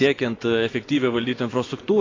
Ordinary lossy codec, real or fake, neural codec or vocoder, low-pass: AAC, 32 kbps; fake; vocoder, 24 kHz, 100 mel bands, Vocos; 7.2 kHz